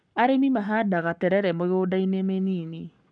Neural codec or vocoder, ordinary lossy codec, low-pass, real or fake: codec, 44.1 kHz, 7.8 kbps, Pupu-Codec; none; 9.9 kHz; fake